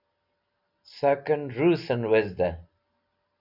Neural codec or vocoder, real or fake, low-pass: none; real; 5.4 kHz